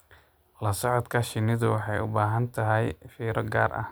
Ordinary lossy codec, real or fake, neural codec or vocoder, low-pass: none; real; none; none